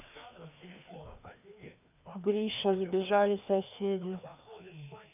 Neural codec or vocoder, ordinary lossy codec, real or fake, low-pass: codec, 16 kHz, 2 kbps, FreqCodec, larger model; none; fake; 3.6 kHz